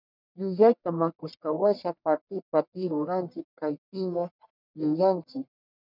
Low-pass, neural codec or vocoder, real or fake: 5.4 kHz; codec, 44.1 kHz, 1.7 kbps, Pupu-Codec; fake